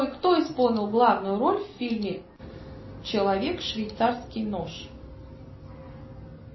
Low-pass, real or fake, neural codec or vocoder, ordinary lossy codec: 7.2 kHz; real; none; MP3, 24 kbps